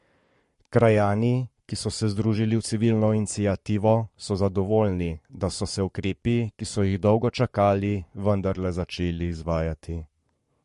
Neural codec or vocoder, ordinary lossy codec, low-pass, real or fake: codec, 44.1 kHz, 7.8 kbps, Pupu-Codec; MP3, 48 kbps; 14.4 kHz; fake